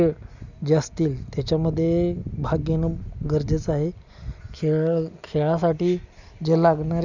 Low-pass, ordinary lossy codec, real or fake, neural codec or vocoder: 7.2 kHz; none; real; none